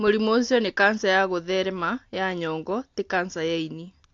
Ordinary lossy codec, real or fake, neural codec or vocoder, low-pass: AAC, 48 kbps; real; none; 7.2 kHz